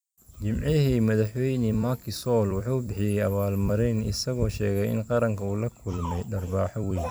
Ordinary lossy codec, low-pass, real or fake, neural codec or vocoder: none; none; fake; vocoder, 44.1 kHz, 128 mel bands every 256 samples, BigVGAN v2